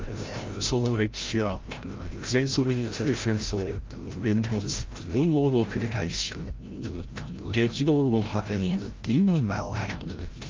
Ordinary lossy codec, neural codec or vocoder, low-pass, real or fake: Opus, 32 kbps; codec, 16 kHz, 0.5 kbps, FreqCodec, larger model; 7.2 kHz; fake